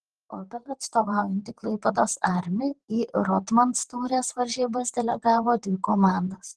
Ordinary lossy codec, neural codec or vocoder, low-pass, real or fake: Opus, 16 kbps; vocoder, 44.1 kHz, 128 mel bands, Pupu-Vocoder; 10.8 kHz; fake